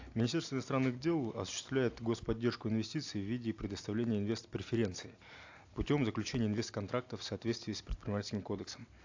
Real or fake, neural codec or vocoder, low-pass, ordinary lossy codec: real; none; 7.2 kHz; AAC, 48 kbps